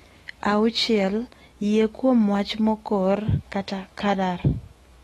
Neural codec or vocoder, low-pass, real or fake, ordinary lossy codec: autoencoder, 48 kHz, 128 numbers a frame, DAC-VAE, trained on Japanese speech; 19.8 kHz; fake; AAC, 32 kbps